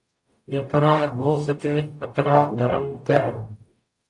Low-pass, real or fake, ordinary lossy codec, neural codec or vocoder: 10.8 kHz; fake; AAC, 64 kbps; codec, 44.1 kHz, 0.9 kbps, DAC